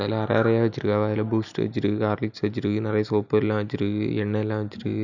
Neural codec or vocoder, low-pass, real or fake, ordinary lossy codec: none; 7.2 kHz; real; none